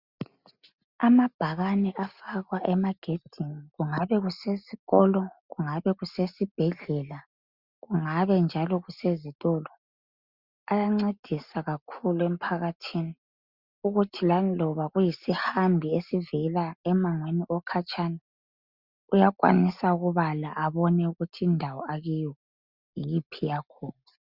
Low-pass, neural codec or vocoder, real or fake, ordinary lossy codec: 5.4 kHz; none; real; Opus, 64 kbps